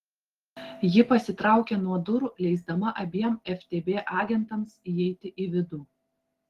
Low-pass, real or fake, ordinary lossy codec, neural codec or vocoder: 14.4 kHz; real; Opus, 16 kbps; none